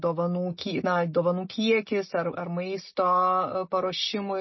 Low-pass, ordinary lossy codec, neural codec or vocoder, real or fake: 7.2 kHz; MP3, 24 kbps; none; real